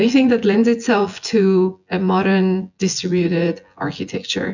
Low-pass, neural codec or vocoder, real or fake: 7.2 kHz; vocoder, 24 kHz, 100 mel bands, Vocos; fake